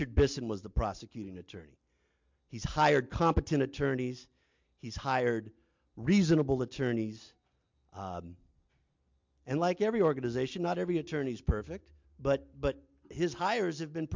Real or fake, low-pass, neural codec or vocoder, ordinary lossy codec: real; 7.2 kHz; none; MP3, 64 kbps